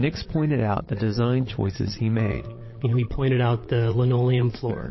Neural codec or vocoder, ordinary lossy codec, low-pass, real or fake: vocoder, 22.05 kHz, 80 mel bands, Vocos; MP3, 24 kbps; 7.2 kHz; fake